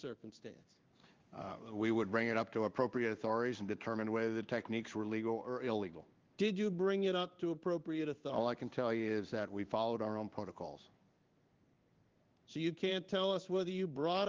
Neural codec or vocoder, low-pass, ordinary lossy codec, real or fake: codec, 16 kHz in and 24 kHz out, 1 kbps, XY-Tokenizer; 7.2 kHz; Opus, 32 kbps; fake